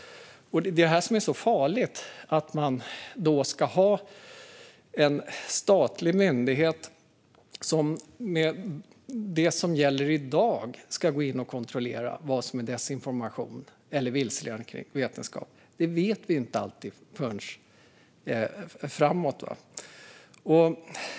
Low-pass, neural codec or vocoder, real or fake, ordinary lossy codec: none; none; real; none